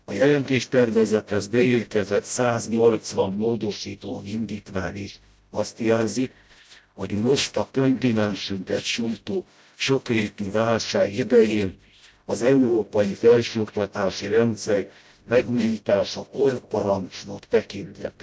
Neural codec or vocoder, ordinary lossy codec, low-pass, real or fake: codec, 16 kHz, 0.5 kbps, FreqCodec, smaller model; none; none; fake